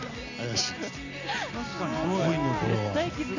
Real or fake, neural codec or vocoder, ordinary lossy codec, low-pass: real; none; none; 7.2 kHz